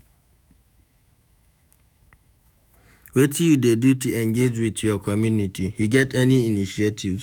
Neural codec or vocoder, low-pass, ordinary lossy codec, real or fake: autoencoder, 48 kHz, 128 numbers a frame, DAC-VAE, trained on Japanese speech; none; none; fake